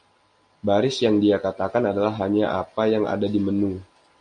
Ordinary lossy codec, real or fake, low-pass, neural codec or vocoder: MP3, 48 kbps; real; 9.9 kHz; none